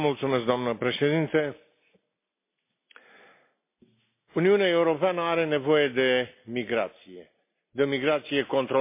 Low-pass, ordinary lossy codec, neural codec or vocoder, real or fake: 3.6 kHz; MP3, 24 kbps; none; real